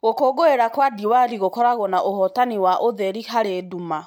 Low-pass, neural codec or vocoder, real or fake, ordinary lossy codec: 19.8 kHz; none; real; MP3, 96 kbps